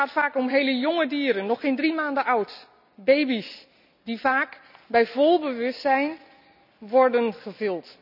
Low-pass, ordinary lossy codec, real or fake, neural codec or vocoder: 5.4 kHz; none; real; none